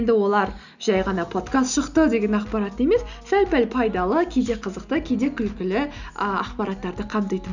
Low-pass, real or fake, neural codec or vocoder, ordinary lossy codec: 7.2 kHz; real; none; none